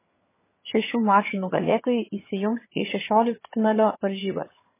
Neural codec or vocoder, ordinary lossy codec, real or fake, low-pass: vocoder, 22.05 kHz, 80 mel bands, HiFi-GAN; MP3, 16 kbps; fake; 3.6 kHz